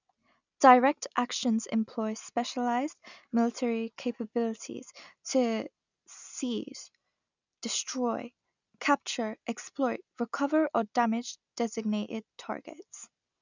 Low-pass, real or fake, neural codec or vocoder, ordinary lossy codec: 7.2 kHz; real; none; none